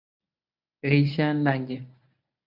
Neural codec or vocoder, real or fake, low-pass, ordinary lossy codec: codec, 24 kHz, 0.9 kbps, WavTokenizer, medium speech release version 1; fake; 5.4 kHz; AAC, 48 kbps